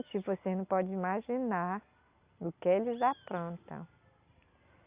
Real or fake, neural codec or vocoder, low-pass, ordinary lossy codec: real; none; 3.6 kHz; Opus, 64 kbps